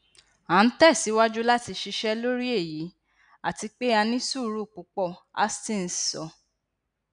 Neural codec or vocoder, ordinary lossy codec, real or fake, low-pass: none; none; real; 9.9 kHz